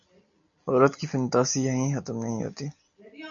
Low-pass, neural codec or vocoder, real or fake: 7.2 kHz; none; real